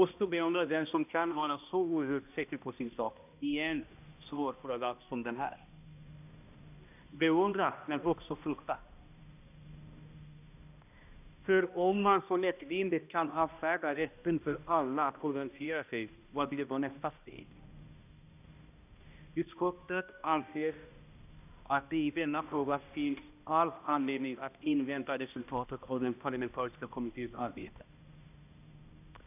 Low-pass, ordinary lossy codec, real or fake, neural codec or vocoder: 3.6 kHz; none; fake; codec, 16 kHz, 1 kbps, X-Codec, HuBERT features, trained on balanced general audio